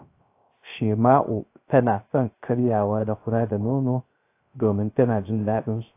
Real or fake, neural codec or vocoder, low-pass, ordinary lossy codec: fake; codec, 16 kHz, 0.3 kbps, FocalCodec; 3.6 kHz; AAC, 24 kbps